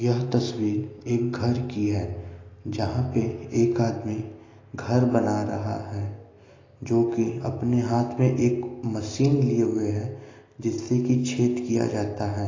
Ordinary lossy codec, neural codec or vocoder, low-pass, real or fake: AAC, 32 kbps; none; 7.2 kHz; real